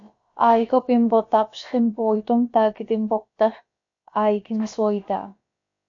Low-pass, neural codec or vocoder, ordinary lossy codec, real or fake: 7.2 kHz; codec, 16 kHz, about 1 kbps, DyCAST, with the encoder's durations; MP3, 48 kbps; fake